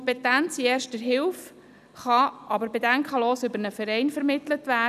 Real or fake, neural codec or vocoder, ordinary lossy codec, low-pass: real; none; none; 14.4 kHz